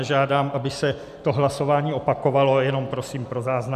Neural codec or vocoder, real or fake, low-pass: none; real; 14.4 kHz